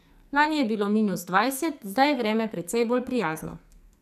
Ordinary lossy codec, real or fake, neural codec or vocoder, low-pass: none; fake; codec, 44.1 kHz, 2.6 kbps, SNAC; 14.4 kHz